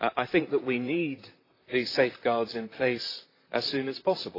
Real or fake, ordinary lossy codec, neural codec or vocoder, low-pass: fake; AAC, 24 kbps; vocoder, 44.1 kHz, 128 mel bands, Pupu-Vocoder; 5.4 kHz